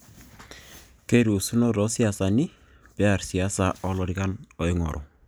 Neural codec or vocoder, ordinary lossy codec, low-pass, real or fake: none; none; none; real